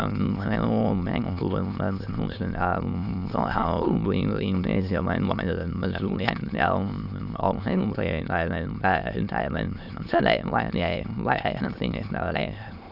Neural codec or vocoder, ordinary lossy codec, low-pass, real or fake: autoencoder, 22.05 kHz, a latent of 192 numbers a frame, VITS, trained on many speakers; none; 5.4 kHz; fake